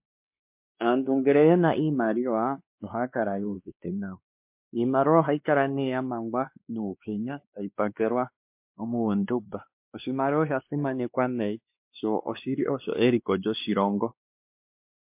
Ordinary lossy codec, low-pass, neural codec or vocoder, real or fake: MP3, 32 kbps; 3.6 kHz; codec, 16 kHz, 2 kbps, X-Codec, WavLM features, trained on Multilingual LibriSpeech; fake